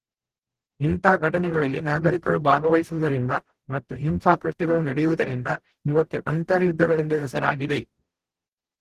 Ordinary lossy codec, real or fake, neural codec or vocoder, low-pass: Opus, 16 kbps; fake; codec, 44.1 kHz, 0.9 kbps, DAC; 14.4 kHz